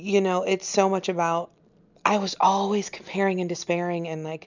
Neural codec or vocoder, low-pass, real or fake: none; 7.2 kHz; real